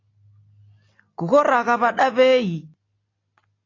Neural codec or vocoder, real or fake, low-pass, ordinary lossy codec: none; real; 7.2 kHz; AAC, 32 kbps